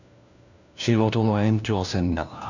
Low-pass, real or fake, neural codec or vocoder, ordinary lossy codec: 7.2 kHz; fake; codec, 16 kHz, 1 kbps, FunCodec, trained on LibriTTS, 50 frames a second; none